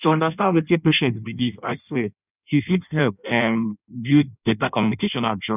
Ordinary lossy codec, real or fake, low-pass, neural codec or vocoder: none; fake; 3.6 kHz; codec, 16 kHz in and 24 kHz out, 0.6 kbps, FireRedTTS-2 codec